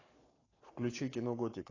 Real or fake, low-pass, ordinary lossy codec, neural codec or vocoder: fake; 7.2 kHz; AAC, 32 kbps; codec, 44.1 kHz, 7.8 kbps, Pupu-Codec